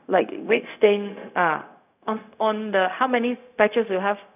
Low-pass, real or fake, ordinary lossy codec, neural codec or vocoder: 3.6 kHz; fake; none; codec, 16 kHz, 0.4 kbps, LongCat-Audio-Codec